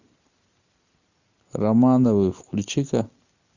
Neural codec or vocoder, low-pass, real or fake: none; 7.2 kHz; real